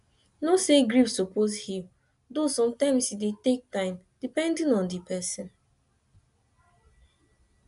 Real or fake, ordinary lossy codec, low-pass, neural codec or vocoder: real; MP3, 96 kbps; 10.8 kHz; none